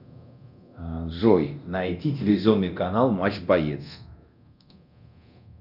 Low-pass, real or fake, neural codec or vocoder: 5.4 kHz; fake; codec, 24 kHz, 0.9 kbps, DualCodec